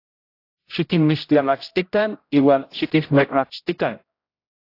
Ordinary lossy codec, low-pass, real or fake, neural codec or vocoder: AAC, 32 kbps; 5.4 kHz; fake; codec, 16 kHz, 0.5 kbps, X-Codec, HuBERT features, trained on general audio